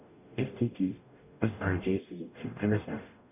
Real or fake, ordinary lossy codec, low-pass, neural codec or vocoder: fake; none; 3.6 kHz; codec, 44.1 kHz, 0.9 kbps, DAC